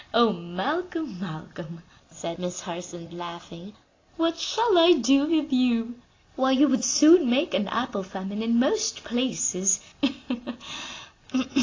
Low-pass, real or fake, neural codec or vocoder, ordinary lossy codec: 7.2 kHz; real; none; AAC, 32 kbps